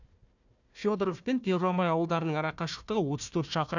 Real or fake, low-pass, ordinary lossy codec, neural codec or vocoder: fake; 7.2 kHz; none; codec, 16 kHz, 1 kbps, FunCodec, trained on Chinese and English, 50 frames a second